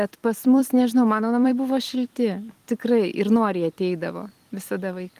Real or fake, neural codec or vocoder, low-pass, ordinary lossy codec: fake; vocoder, 44.1 kHz, 128 mel bands every 256 samples, BigVGAN v2; 14.4 kHz; Opus, 24 kbps